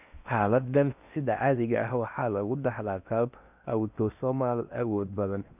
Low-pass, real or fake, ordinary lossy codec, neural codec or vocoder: 3.6 kHz; fake; none; codec, 16 kHz in and 24 kHz out, 0.6 kbps, FocalCodec, streaming, 4096 codes